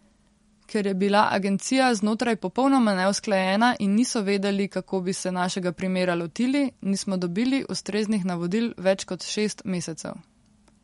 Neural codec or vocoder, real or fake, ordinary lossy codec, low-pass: none; real; MP3, 48 kbps; 19.8 kHz